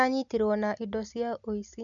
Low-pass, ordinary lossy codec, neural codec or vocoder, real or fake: 7.2 kHz; none; none; real